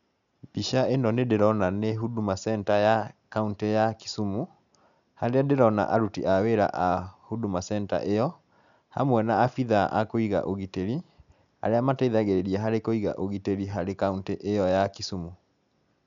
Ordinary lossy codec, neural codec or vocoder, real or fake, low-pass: none; none; real; 7.2 kHz